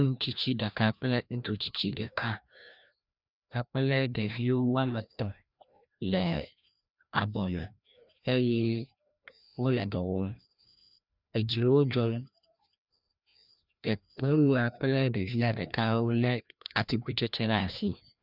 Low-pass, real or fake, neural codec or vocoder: 5.4 kHz; fake; codec, 16 kHz, 1 kbps, FreqCodec, larger model